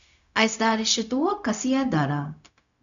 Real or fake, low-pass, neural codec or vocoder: fake; 7.2 kHz; codec, 16 kHz, 0.4 kbps, LongCat-Audio-Codec